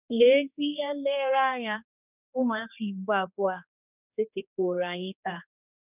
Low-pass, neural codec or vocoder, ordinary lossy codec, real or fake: 3.6 kHz; codec, 16 kHz, 2 kbps, X-Codec, HuBERT features, trained on general audio; none; fake